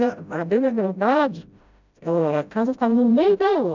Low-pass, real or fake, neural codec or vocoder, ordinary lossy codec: 7.2 kHz; fake; codec, 16 kHz, 0.5 kbps, FreqCodec, smaller model; none